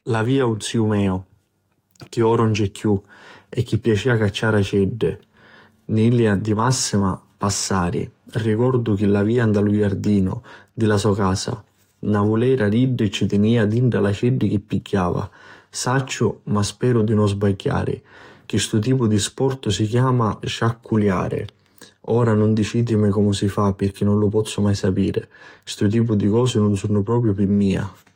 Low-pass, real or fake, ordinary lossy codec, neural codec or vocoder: 19.8 kHz; fake; AAC, 48 kbps; codec, 44.1 kHz, 7.8 kbps, DAC